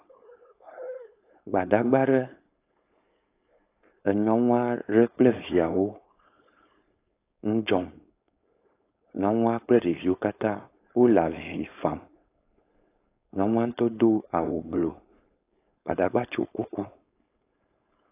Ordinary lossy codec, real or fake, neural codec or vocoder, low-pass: AAC, 24 kbps; fake; codec, 16 kHz, 4.8 kbps, FACodec; 3.6 kHz